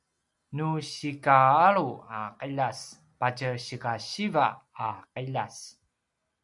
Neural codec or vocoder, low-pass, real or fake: none; 10.8 kHz; real